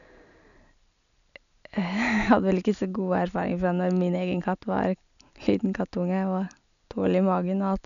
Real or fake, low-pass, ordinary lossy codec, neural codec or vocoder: real; 7.2 kHz; none; none